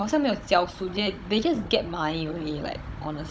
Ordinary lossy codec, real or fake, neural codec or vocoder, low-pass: none; fake; codec, 16 kHz, 16 kbps, FunCodec, trained on Chinese and English, 50 frames a second; none